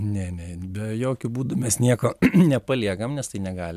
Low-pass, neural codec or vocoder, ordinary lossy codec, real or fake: 14.4 kHz; vocoder, 44.1 kHz, 128 mel bands every 256 samples, BigVGAN v2; MP3, 96 kbps; fake